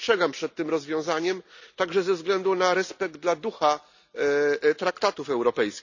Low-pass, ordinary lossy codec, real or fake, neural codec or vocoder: 7.2 kHz; none; real; none